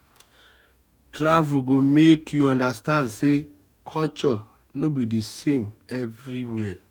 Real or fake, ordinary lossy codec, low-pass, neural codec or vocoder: fake; none; 19.8 kHz; codec, 44.1 kHz, 2.6 kbps, DAC